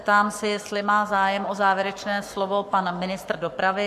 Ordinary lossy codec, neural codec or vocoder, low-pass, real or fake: MP3, 64 kbps; codec, 44.1 kHz, 7.8 kbps, Pupu-Codec; 14.4 kHz; fake